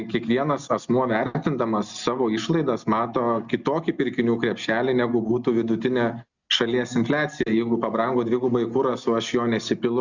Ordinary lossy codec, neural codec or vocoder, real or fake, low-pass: Opus, 64 kbps; none; real; 7.2 kHz